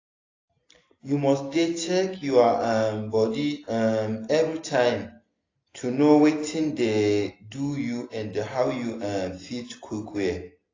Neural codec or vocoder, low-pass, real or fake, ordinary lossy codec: none; 7.2 kHz; real; AAC, 32 kbps